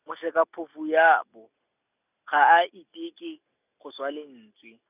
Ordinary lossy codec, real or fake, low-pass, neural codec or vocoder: none; real; 3.6 kHz; none